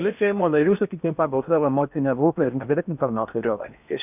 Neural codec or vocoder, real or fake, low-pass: codec, 16 kHz in and 24 kHz out, 0.8 kbps, FocalCodec, streaming, 65536 codes; fake; 3.6 kHz